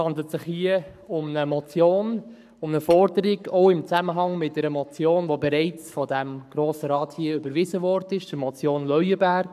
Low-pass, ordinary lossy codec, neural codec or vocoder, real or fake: 14.4 kHz; none; codec, 44.1 kHz, 7.8 kbps, Pupu-Codec; fake